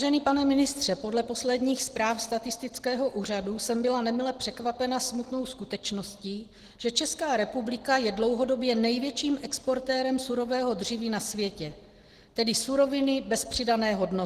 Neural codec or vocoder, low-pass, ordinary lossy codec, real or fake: none; 14.4 kHz; Opus, 16 kbps; real